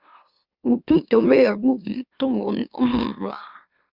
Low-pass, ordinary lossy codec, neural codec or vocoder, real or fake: 5.4 kHz; Opus, 64 kbps; autoencoder, 44.1 kHz, a latent of 192 numbers a frame, MeloTTS; fake